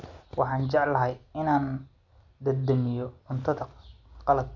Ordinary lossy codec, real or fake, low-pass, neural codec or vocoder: none; real; 7.2 kHz; none